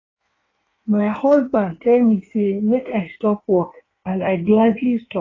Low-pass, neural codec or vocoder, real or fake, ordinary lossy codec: 7.2 kHz; codec, 16 kHz in and 24 kHz out, 1.1 kbps, FireRedTTS-2 codec; fake; AAC, 32 kbps